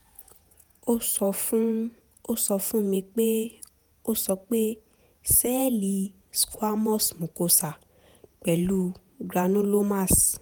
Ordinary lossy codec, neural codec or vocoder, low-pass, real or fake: none; vocoder, 48 kHz, 128 mel bands, Vocos; none; fake